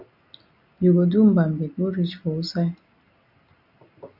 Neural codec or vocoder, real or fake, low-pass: none; real; 5.4 kHz